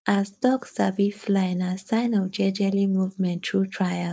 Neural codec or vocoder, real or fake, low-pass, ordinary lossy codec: codec, 16 kHz, 4.8 kbps, FACodec; fake; none; none